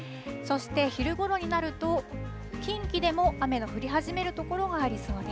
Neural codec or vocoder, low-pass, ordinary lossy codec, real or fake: none; none; none; real